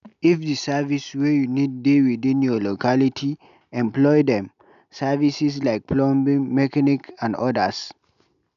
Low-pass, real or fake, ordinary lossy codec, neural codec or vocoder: 7.2 kHz; real; none; none